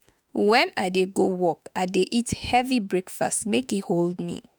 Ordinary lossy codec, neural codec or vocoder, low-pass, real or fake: none; autoencoder, 48 kHz, 32 numbers a frame, DAC-VAE, trained on Japanese speech; none; fake